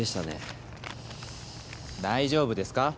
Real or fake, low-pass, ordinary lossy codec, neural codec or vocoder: real; none; none; none